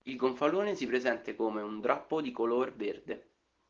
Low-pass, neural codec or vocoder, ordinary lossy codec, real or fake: 7.2 kHz; none; Opus, 16 kbps; real